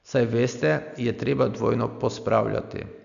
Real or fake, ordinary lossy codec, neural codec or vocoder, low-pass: real; none; none; 7.2 kHz